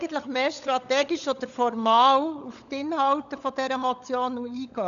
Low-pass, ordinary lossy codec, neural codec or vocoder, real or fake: 7.2 kHz; none; codec, 16 kHz, 16 kbps, FunCodec, trained on LibriTTS, 50 frames a second; fake